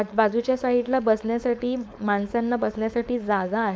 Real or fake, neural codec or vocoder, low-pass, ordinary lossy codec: fake; codec, 16 kHz, 4.8 kbps, FACodec; none; none